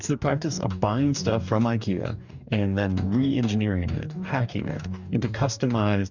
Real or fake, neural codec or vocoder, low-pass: fake; codec, 44.1 kHz, 2.6 kbps, DAC; 7.2 kHz